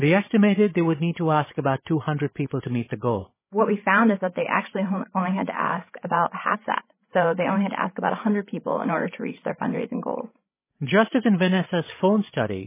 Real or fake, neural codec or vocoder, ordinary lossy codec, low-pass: fake; codec, 16 kHz, 16 kbps, FreqCodec, larger model; MP3, 16 kbps; 3.6 kHz